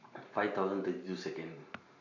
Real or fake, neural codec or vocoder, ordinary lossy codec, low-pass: fake; vocoder, 44.1 kHz, 128 mel bands every 512 samples, BigVGAN v2; none; 7.2 kHz